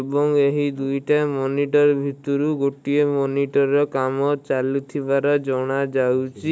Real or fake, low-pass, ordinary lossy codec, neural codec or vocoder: real; none; none; none